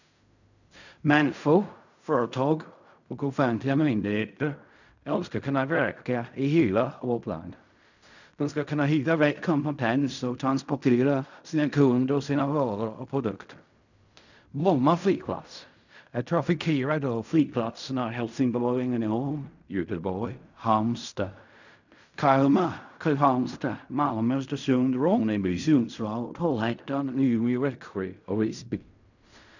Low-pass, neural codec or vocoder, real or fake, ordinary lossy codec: 7.2 kHz; codec, 16 kHz in and 24 kHz out, 0.4 kbps, LongCat-Audio-Codec, fine tuned four codebook decoder; fake; none